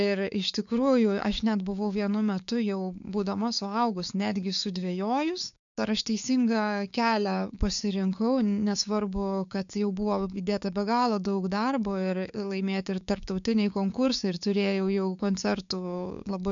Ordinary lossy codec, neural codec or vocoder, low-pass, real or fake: MP3, 96 kbps; codec, 16 kHz, 6 kbps, DAC; 7.2 kHz; fake